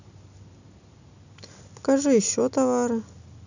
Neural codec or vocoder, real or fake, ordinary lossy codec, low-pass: none; real; none; 7.2 kHz